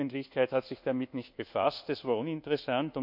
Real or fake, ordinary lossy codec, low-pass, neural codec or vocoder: fake; none; 5.4 kHz; codec, 24 kHz, 1.2 kbps, DualCodec